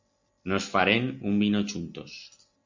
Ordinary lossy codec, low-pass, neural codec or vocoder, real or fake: MP3, 48 kbps; 7.2 kHz; none; real